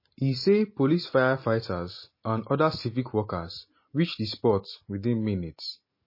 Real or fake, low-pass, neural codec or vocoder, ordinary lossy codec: real; 5.4 kHz; none; MP3, 24 kbps